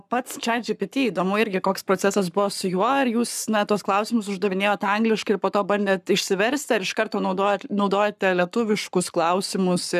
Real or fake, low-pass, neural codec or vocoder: fake; 14.4 kHz; codec, 44.1 kHz, 7.8 kbps, Pupu-Codec